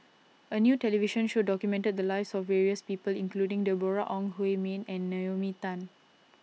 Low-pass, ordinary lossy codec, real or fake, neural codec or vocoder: none; none; real; none